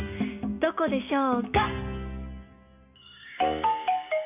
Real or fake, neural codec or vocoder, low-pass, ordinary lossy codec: fake; codec, 16 kHz, 6 kbps, DAC; 3.6 kHz; none